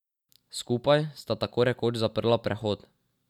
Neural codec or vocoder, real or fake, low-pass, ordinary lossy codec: none; real; 19.8 kHz; none